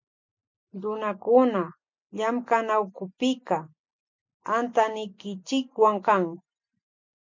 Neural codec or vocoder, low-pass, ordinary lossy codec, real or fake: none; 7.2 kHz; MP3, 64 kbps; real